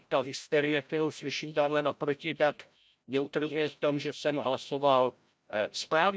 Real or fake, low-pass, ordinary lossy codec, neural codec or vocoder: fake; none; none; codec, 16 kHz, 0.5 kbps, FreqCodec, larger model